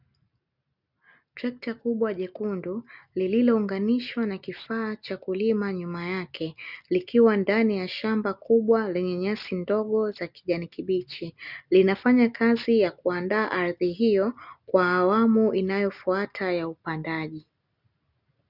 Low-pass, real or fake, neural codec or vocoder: 5.4 kHz; real; none